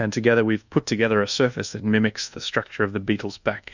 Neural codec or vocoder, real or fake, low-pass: codec, 24 kHz, 1.2 kbps, DualCodec; fake; 7.2 kHz